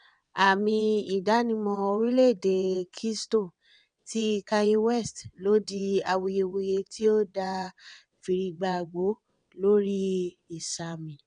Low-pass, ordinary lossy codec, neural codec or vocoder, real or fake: 9.9 kHz; none; vocoder, 22.05 kHz, 80 mel bands, WaveNeXt; fake